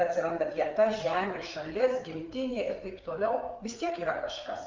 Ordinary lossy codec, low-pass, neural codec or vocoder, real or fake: Opus, 24 kbps; 7.2 kHz; codec, 16 kHz, 4 kbps, FreqCodec, larger model; fake